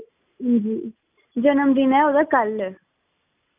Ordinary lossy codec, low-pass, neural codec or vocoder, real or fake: none; 3.6 kHz; none; real